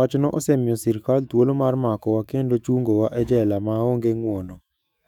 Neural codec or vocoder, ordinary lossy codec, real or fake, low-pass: autoencoder, 48 kHz, 128 numbers a frame, DAC-VAE, trained on Japanese speech; none; fake; 19.8 kHz